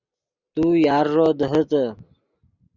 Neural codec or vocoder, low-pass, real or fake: none; 7.2 kHz; real